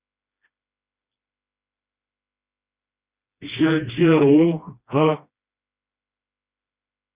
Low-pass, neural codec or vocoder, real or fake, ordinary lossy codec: 3.6 kHz; codec, 16 kHz, 1 kbps, FreqCodec, smaller model; fake; Opus, 64 kbps